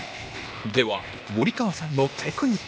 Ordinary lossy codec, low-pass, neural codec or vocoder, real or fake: none; none; codec, 16 kHz, 0.8 kbps, ZipCodec; fake